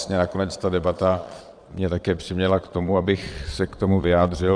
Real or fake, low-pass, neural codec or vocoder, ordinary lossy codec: fake; 9.9 kHz; vocoder, 22.05 kHz, 80 mel bands, Vocos; Opus, 64 kbps